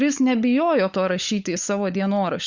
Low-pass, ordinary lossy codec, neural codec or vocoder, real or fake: 7.2 kHz; Opus, 64 kbps; codec, 16 kHz, 16 kbps, FunCodec, trained on LibriTTS, 50 frames a second; fake